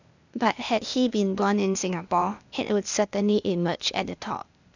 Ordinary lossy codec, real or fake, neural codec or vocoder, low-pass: none; fake; codec, 16 kHz, 0.8 kbps, ZipCodec; 7.2 kHz